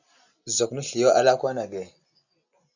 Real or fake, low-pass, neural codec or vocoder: real; 7.2 kHz; none